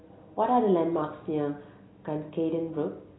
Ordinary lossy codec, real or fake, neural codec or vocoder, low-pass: AAC, 16 kbps; real; none; 7.2 kHz